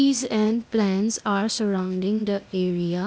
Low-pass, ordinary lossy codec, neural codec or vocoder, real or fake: none; none; codec, 16 kHz, 0.8 kbps, ZipCodec; fake